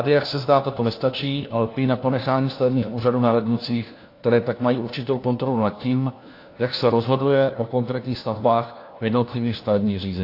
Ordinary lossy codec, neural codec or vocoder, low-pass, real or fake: AAC, 32 kbps; codec, 16 kHz, 1 kbps, FunCodec, trained on LibriTTS, 50 frames a second; 5.4 kHz; fake